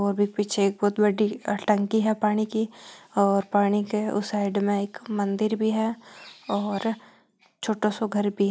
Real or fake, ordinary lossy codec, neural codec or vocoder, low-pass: real; none; none; none